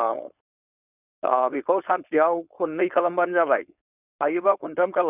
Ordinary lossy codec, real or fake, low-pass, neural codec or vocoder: none; fake; 3.6 kHz; codec, 16 kHz, 4.8 kbps, FACodec